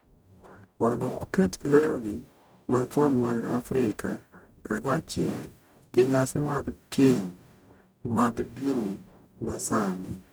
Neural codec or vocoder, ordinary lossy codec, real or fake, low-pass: codec, 44.1 kHz, 0.9 kbps, DAC; none; fake; none